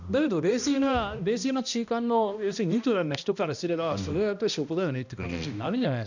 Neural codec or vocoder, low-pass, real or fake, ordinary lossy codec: codec, 16 kHz, 1 kbps, X-Codec, HuBERT features, trained on balanced general audio; 7.2 kHz; fake; none